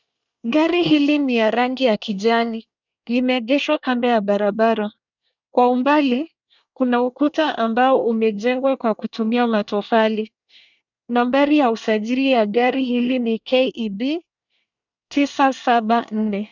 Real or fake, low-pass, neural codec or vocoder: fake; 7.2 kHz; codec, 24 kHz, 1 kbps, SNAC